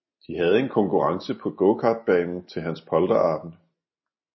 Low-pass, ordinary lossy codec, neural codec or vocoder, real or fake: 7.2 kHz; MP3, 24 kbps; none; real